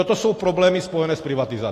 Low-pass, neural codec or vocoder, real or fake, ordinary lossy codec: 14.4 kHz; none; real; AAC, 48 kbps